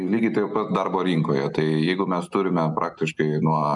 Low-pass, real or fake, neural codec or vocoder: 10.8 kHz; real; none